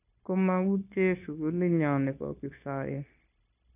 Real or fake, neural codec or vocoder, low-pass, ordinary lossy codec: fake; codec, 16 kHz, 0.9 kbps, LongCat-Audio-Codec; 3.6 kHz; none